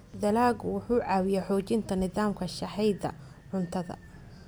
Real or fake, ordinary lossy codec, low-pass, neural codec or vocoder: real; none; none; none